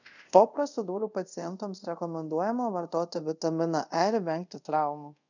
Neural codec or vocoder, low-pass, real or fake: codec, 24 kHz, 0.5 kbps, DualCodec; 7.2 kHz; fake